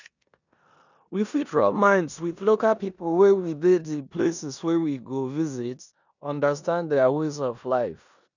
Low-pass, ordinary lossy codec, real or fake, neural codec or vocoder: 7.2 kHz; none; fake; codec, 16 kHz in and 24 kHz out, 0.9 kbps, LongCat-Audio-Codec, four codebook decoder